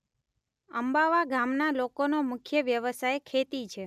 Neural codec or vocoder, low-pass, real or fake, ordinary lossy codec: none; 14.4 kHz; real; none